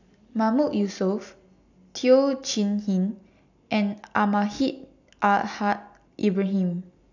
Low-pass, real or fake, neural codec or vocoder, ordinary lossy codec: 7.2 kHz; real; none; none